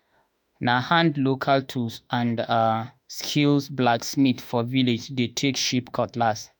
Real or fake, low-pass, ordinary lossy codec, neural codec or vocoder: fake; none; none; autoencoder, 48 kHz, 32 numbers a frame, DAC-VAE, trained on Japanese speech